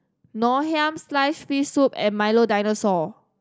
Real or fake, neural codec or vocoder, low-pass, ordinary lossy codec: real; none; none; none